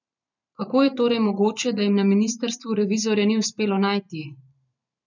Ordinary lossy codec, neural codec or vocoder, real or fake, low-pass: none; vocoder, 44.1 kHz, 128 mel bands every 512 samples, BigVGAN v2; fake; 7.2 kHz